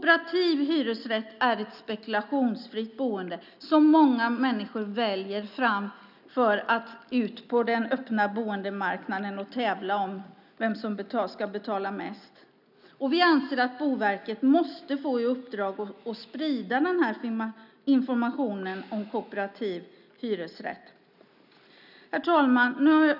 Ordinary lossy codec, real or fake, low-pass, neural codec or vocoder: none; real; 5.4 kHz; none